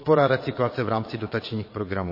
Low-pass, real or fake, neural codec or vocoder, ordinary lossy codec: 5.4 kHz; fake; vocoder, 22.05 kHz, 80 mel bands, Vocos; MP3, 24 kbps